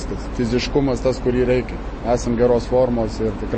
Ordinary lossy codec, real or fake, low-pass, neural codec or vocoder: MP3, 32 kbps; real; 9.9 kHz; none